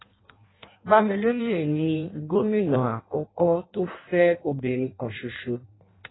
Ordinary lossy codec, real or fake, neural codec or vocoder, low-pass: AAC, 16 kbps; fake; codec, 16 kHz in and 24 kHz out, 0.6 kbps, FireRedTTS-2 codec; 7.2 kHz